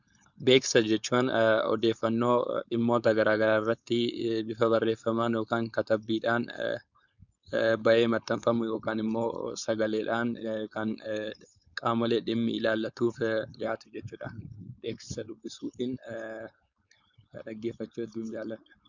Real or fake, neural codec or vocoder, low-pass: fake; codec, 16 kHz, 4.8 kbps, FACodec; 7.2 kHz